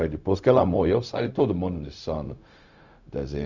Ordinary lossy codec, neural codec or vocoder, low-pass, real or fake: none; codec, 16 kHz, 0.4 kbps, LongCat-Audio-Codec; 7.2 kHz; fake